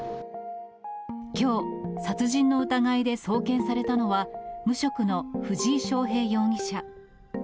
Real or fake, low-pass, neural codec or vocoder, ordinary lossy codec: real; none; none; none